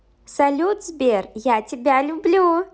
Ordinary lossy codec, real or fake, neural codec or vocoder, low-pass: none; real; none; none